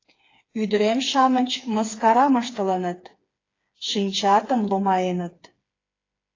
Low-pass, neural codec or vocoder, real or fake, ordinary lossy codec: 7.2 kHz; codec, 16 kHz, 4 kbps, FreqCodec, smaller model; fake; AAC, 32 kbps